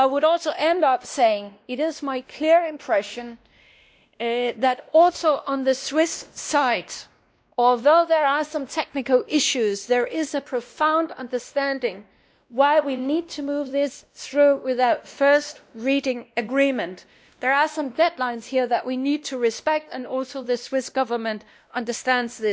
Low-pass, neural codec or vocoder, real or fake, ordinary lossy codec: none; codec, 16 kHz, 1 kbps, X-Codec, WavLM features, trained on Multilingual LibriSpeech; fake; none